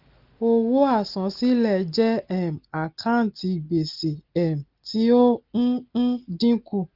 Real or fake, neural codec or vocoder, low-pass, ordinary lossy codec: real; none; 5.4 kHz; Opus, 24 kbps